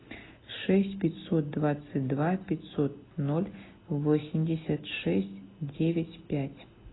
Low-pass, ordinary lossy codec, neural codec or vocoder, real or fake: 7.2 kHz; AAC, 16 kbps; none; real